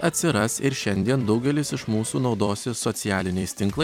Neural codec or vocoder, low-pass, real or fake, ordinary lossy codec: vocoder, 44.1 kHz, 128 mel bands every 256 samples, BigVGAN v2; 19.8 kHz; fake; MP3, 96 kbps